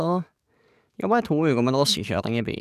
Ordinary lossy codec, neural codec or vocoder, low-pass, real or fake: none; codec, 44.1 kHz, 7.8 kbps, DAC; 14.4 kHz; fake